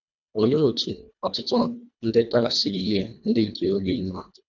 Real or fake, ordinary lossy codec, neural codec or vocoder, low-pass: fake; none; codec, 24 kHz, 1.5 kbps, HILCodec; 7.2 kHz